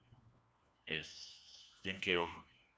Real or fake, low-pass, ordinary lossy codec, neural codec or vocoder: fake; none; none; codec, 16 kHz, 1 kbps, FunCodec, trained on LibriTTS, 50 frames a second